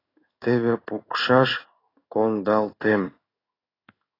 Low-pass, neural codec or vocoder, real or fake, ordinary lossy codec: 5.4 kHz; codec, 16 kHz in and 24 kHz out, 1 kbps, XY-Tokenizer; fake; AAC, 24 kbps